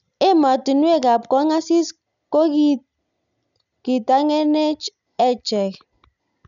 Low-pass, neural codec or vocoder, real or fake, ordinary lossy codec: 7.2 kHz; none; real; none